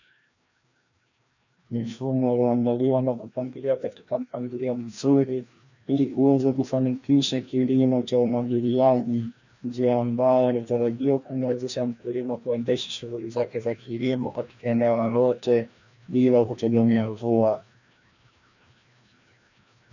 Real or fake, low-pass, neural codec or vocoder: fake; 7.2 kHz; codec, 16 kHz, 1 kbps, FreqCodec, larger model